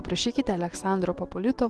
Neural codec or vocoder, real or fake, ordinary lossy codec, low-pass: none; real; Opus, 16 kbps; 10.8 kHz